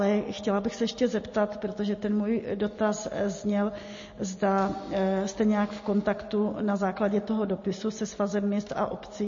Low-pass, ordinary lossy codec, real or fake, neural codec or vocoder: 7.2 kHz; MP3, 32 kbps; real; none